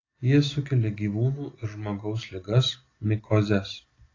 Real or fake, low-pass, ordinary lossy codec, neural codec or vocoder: real; 7.2 kHz; AAC, 32 kbps; none